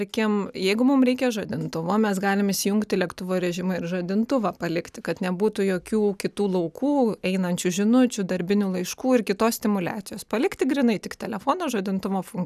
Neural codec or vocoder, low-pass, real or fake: none; 14.4 kHz; real